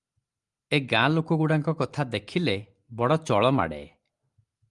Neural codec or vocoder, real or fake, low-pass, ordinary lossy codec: none; real; 10.8 kHz; Opus, 32 kbps